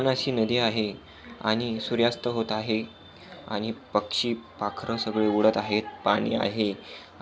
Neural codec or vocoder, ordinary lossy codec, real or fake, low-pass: none; none; real; none